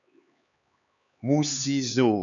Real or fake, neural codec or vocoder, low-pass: fake; codec, 16 kHz, 4 kbps, X-Codec, HuBERT features, trained on LibriSpeech; 7.2 kHz